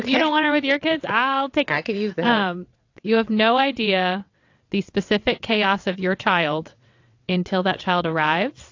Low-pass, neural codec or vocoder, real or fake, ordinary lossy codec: 7.2 kHz; vocoder, 22.05 kHz, 80 mel bands, WaveNeXt; fake; AAC, 48 kbps